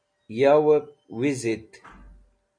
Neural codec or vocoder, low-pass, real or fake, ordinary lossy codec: none; 9.9 kHz; real; MP3, 48 kbps